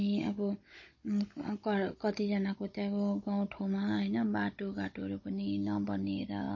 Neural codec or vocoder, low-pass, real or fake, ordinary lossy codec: codec, 16 kHz, 16 kbps, FunCodec, trained on Chinese and English, 50 frames a second; 7.2 kHz; fake; MP3, 32 kbps